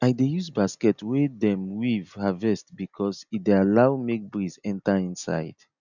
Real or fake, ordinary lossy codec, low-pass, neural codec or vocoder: real; none; 7.2 kHz; none